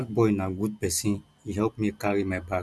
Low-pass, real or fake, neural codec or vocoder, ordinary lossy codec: none; real; none; none